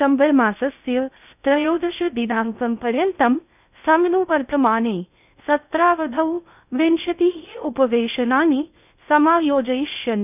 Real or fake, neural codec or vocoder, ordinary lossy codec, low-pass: fake; codec, 16 kHz in and 24 kHz out, 0.6 kbps, FocalCodec, streaming, 2048 codes; none; 3.6 kHz